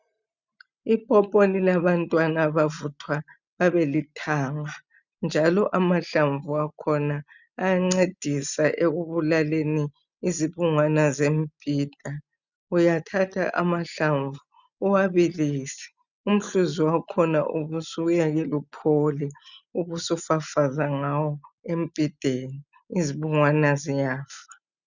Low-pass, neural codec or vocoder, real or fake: 7.2 kHz; none; real